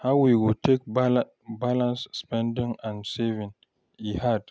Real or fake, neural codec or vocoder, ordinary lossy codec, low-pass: real; none; none; none